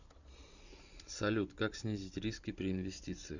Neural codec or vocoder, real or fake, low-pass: vocoder, 44.1 kHz, 128 mel bands every 512 samples, BigVGAN v2; fake; 7.2 kHz